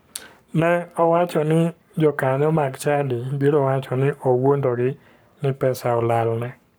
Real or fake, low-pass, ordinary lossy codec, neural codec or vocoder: fake; none; none; codec, 44.1 kHz, 7.8 kbps, Pupu-Codec